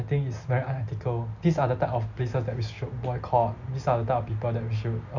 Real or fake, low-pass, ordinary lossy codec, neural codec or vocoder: real; 7.2 kHz; none; none